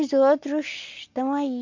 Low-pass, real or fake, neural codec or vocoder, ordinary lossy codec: 7.2 kHz; real; none; MP3, 48 kbps